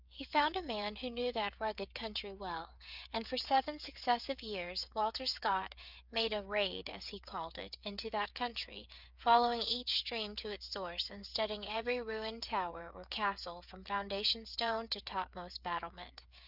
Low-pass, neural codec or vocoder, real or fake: 5.4 kHz; codec, 16 kHz, 8 kbps, FreqCodec, smaller model; fake